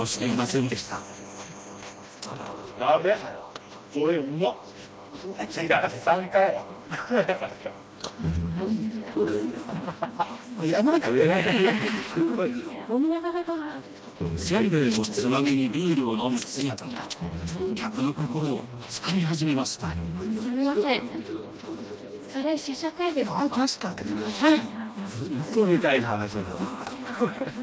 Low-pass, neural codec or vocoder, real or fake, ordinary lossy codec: none; codec, 16 kHz, 1 kbps, FreqCodec, smaller model; fake; none